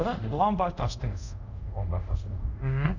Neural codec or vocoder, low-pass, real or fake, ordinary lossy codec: codec, 24 kHz, 0.5 kbps, DualCodec; 7.2 kHz; fake; none